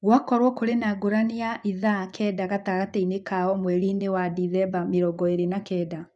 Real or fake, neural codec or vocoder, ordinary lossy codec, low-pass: fake; vocoder, 24 kHz, 100 mel bands, Vocos; none; 10.8 kHz